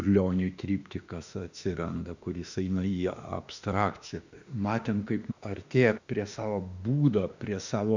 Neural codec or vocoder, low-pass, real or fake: autoencoder, 48 kHz, 32 numbers a frame, DAC-VAE, trained on Japanese speech; 7.2 kHz; fake